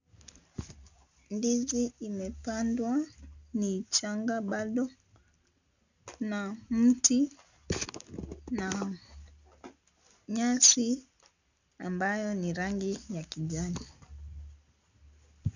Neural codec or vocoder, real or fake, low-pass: none; real; 7.2 kHz